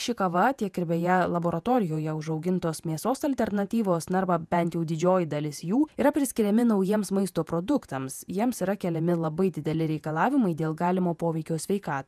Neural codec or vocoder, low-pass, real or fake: vocoder, 48 kHz, 128 mel bands, Vocos; 14.4 kHz; fake